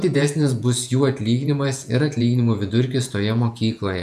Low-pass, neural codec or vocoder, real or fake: 14.4 kHz; vocoder, 48 kHz, 128 mel bands, Vocos; fake